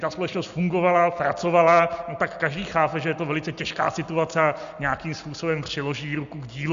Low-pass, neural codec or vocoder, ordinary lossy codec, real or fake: 7.2 kHz; none; Opus, 64 kbps; real